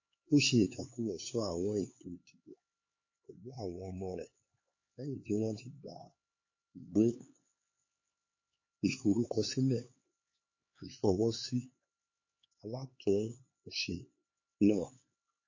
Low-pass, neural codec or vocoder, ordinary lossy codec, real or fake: 7.2 kHz; codec, 16 kHz, 4 kbps, X-Codec, HuBERT features, trained on LibriSpeech; MP3, 32 kbps; fake